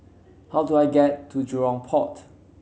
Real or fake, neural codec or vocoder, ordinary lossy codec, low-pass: real; none; none; none